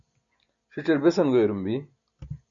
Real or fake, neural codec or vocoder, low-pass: real; none; 7.2 kHz